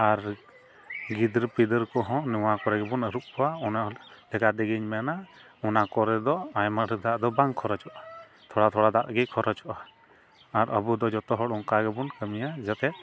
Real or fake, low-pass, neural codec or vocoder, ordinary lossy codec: real; none; none; none